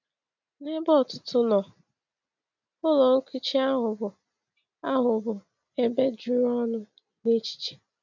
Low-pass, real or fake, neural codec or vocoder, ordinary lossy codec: 7.2 kHz; real; none; none